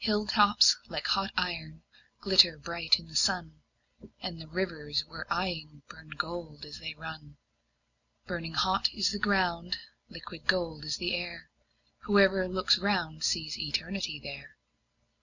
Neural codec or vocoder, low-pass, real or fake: none; 7.2 kHz; real